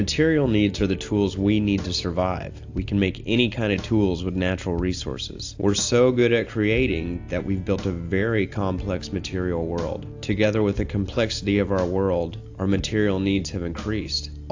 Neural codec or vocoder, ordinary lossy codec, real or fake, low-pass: none; AAC, 48 kbps; real; 7.2 kHz